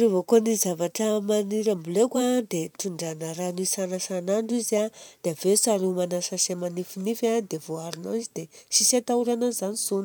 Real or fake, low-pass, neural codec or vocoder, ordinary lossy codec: fake; none; vocoder, 44.1 kHz, 128 mel bands every 512 samples, BigVGAN v2; none